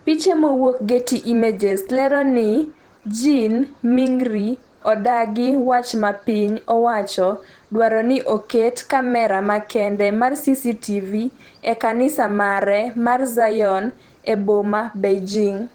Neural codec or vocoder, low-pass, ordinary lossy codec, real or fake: vocoder, 44.1 kHz, 128 mel bands every 512 samples, BigVGAN v2; 19.8 kHz; Opus, 16 kbps; fake